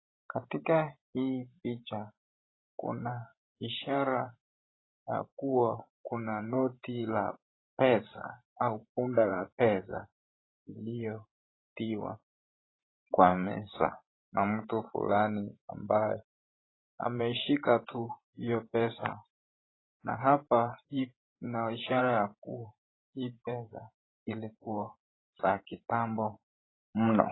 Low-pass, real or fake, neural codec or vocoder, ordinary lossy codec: 7.2 kHz; fake; vocoder, 44.1 kHz, 128 mel bands every 512 samples, BigVGAN v2; AAC, 16 kbps